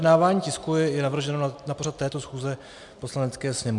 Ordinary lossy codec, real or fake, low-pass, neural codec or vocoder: MP3, 96 kbps; real; 10.8 kHz; none